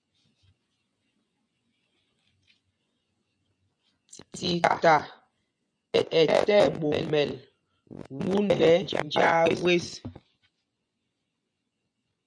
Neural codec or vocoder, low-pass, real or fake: vocoder, 24 kHz, 100 mel bands, Vocos; 9.9 kHz; fake